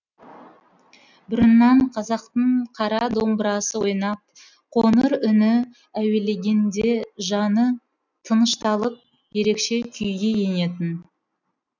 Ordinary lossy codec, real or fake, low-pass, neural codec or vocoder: none; real; 7.2 kHz; none